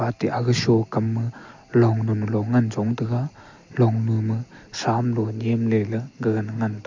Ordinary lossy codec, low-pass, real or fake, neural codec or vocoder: MP3, 48 kbps; 7.2 kHz; real; none